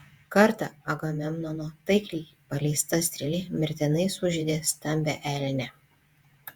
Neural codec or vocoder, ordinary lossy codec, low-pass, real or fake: vocoder, 48 kHz, 128 mel bands, Vocos; Opus, 64 kbps; 19.8 kHz; fake